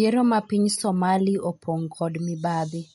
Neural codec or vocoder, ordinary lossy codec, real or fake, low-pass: none; MP3, 48 kbps; real; 19.8 kHz